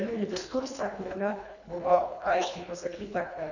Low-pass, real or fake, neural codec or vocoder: 7.2 kHz; fake; codec, 24 kHz, 1.5 kbps, HILCodec